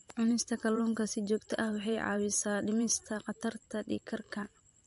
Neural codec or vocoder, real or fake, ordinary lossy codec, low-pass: vocoder, 44.1 kHz, 128 mel bands every 512 samples, BigVGAN v2; fake; MP3, 48 kbps; 14.4 kHz